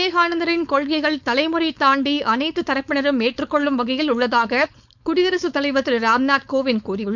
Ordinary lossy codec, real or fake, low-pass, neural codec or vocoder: none; fake; 7.2 kHz; codec, 16 kHz, 4.8 kbps, FACodec